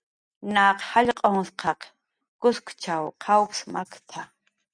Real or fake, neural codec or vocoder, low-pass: real; none; 9.9 kHz